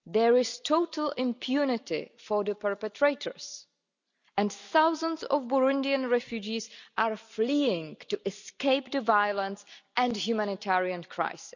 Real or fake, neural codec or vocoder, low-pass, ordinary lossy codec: real; none; 7.2 kHz; none